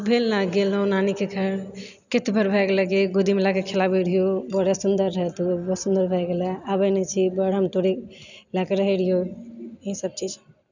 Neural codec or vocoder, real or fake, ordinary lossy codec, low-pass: none; real; none; 7.2 kHz